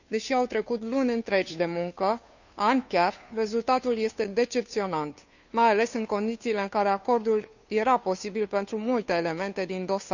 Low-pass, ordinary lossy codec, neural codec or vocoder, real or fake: 7.2 kHz; none; codec, 16 kHz, 2 kbps, FunCodec, trained on Chinese and English, 25 frames a second; fake